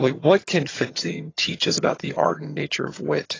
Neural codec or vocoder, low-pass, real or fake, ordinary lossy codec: vocoder, 22.05 kHz, 80 mel bands, HiFi-GAN; 7.2 kHz; fake; AAC, 32 kbps